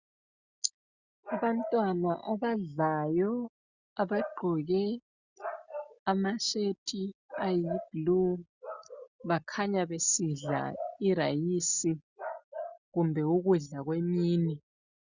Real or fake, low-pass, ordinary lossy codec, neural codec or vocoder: real; 7.2 kHz; Opus, 64 kbps; none